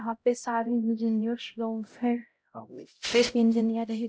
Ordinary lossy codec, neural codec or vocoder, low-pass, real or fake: none; codec, 16 kHz, 0.5 kbps, X-Codec, HuBERT features, trained on LibriSpeech; none; fake